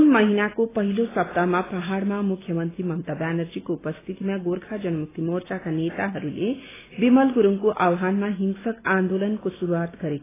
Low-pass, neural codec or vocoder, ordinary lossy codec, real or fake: 3.6 kHz; none; AAC, 16 kbps; real